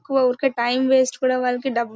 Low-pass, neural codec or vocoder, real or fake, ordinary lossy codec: none; none; real; none